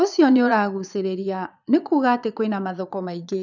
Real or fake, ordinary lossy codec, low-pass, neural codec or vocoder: fake; none; 7.2 kHz; vocoder, 22.05 kHz, 80 mel bands, Vocos